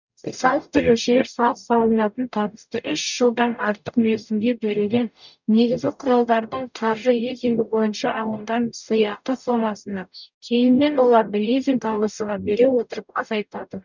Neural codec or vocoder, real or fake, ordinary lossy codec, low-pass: codec, 44.1 kHz, 0.9 kbps, DAC; fake; none; 7.2 kHz